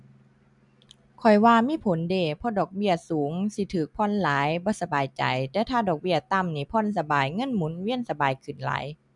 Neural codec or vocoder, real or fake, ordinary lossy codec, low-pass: none; real; none; 10.8 kHz